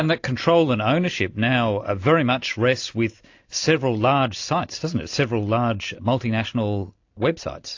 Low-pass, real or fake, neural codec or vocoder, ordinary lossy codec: 7.2 kHz; real; none; AAC, 48 kbps